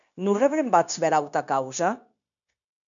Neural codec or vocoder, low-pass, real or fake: codec, 16 kHz, 0.9 kbps, LongCat-Audio-Codec; 7.2 kHz; fake